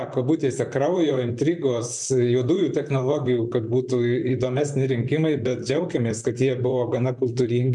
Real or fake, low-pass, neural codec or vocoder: fake; 10.8 kHz; vocoder, 44.1 kHz, 128 mel bands, Pupu-Vocoder